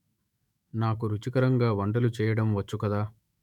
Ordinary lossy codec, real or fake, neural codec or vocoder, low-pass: none; fake; autoencoder, 48 kHz, 128 numbers a frame, DAC-VAE, trained on Japanese speech; 19.8 kHz